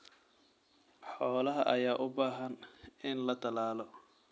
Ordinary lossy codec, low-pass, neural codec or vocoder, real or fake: none; none; none; real